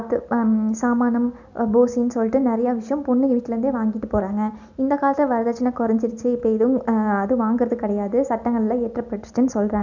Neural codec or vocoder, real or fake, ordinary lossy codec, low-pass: none; real; none; 7.2 kHz